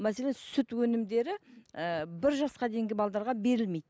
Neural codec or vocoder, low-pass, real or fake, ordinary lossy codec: none; none; real; none